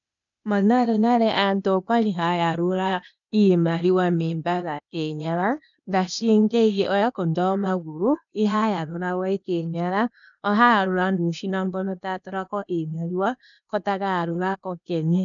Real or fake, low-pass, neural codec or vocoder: fake; 7.2 kHz; codec, 16 kHz, 0.8 kbps, ZipCodec